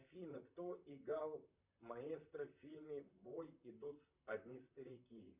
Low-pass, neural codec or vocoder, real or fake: 3.6 kHz; vocoder, 44.1 kHz, 128 mel bands, Pupu-Vocoder; fake